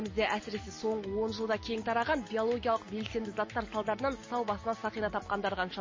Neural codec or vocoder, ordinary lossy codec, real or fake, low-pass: none; MP3, 32 kbps; real; 7.2 kHz